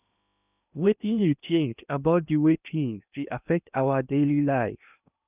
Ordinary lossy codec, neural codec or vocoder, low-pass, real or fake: none; codec, 16 kHz in and 24 kHz out, 0.8 kbps, FocalCodec, streaming, 65536 codes; 3.6 kHz; fake